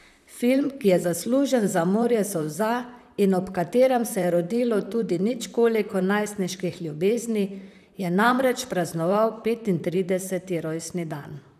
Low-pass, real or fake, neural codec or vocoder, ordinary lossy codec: 14.4 kHz; fake; vocoder, 44.1 kHz, 128 mel bands, Pupu-Vocoder; none